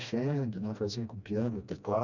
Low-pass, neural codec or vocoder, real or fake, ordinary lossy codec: 7.2 kHz; codec, 16 kHz, 1 kbps, FreqCodec, smaller model; fake; none